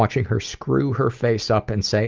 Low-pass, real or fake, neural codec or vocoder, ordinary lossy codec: 7.2 kHz; real; none; Opus, 24 kbps